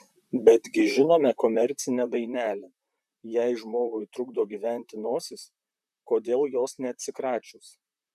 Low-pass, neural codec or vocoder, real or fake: 14.4 kHz; vocoder, 44.1 kHz, 128 mel bands, Pupu-Vocoder; fake